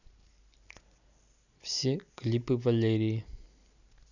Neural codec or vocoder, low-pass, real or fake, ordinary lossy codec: none; 7.2 kHz; real; none